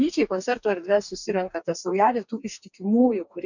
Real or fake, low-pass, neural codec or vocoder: fake; 7.2 kHz; codec, 44.1 kHz, 2.6 kbps, DAC